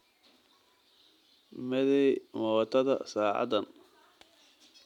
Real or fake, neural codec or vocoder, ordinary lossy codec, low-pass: real; none; none; 19.8 kHz